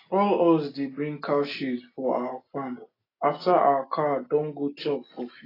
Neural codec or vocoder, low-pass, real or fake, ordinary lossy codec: none; 5.4 kHz; real; AAC, 24 kbps